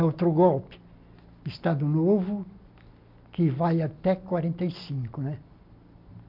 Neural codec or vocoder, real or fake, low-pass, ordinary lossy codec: none; real; 5.4 kHz; MP3, 48 kbps